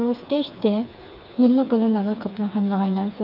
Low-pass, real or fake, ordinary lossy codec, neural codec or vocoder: 5.4 kHz; fake; none; codec, 16 kHz, 4 kbps, FreqCodec, smaller model